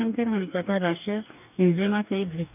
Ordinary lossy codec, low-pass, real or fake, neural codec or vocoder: none; 3.6 kHz; fake; codec, 44.1 kHz, 2.6 kbps, DAC